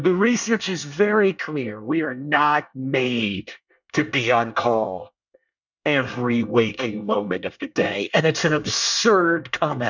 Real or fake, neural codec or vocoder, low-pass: fake; codec, 24 kHz, 1 kbps, SNAC; 7.2 kHz